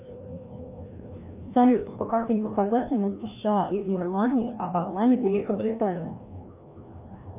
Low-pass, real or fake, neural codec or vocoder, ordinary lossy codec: 3.6 kHz; fake; codec, 16 kHz, 1 kbps, FreqCodec, larger model; AAC, 32 kbps